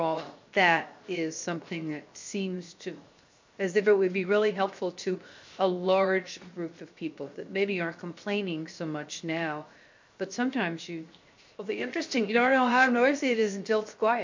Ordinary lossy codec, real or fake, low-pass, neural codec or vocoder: MP3, 48 kbps; fake; 7.2 kHz; codec, 16 kHz, 0.7 kbps, FocalCodec